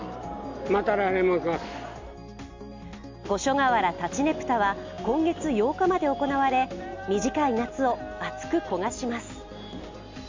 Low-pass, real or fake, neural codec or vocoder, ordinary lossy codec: 7.2 kHz; real; none; MP3, 64 kbps